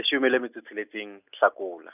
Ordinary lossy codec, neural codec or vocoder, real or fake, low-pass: none; none; real; 3.6 kHz